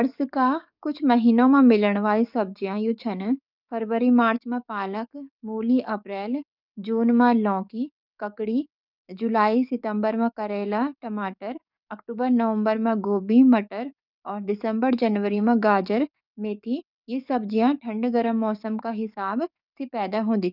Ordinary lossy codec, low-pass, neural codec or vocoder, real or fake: none; 5.4 kHz; codec, 44.1 kHz, 7.8 kbps, DAC; fake